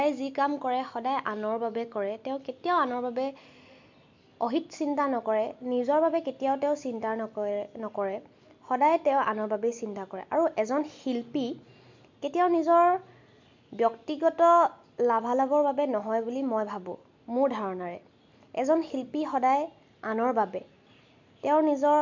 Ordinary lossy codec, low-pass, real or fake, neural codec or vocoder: MP3, 64 kbps; 7.2 kHz; real; none